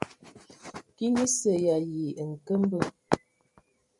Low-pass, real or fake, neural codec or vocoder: 10.8 kHz; fake; vocoder, 44.1 kHz, 128 mel bands every 512 samples, BigVGAN v2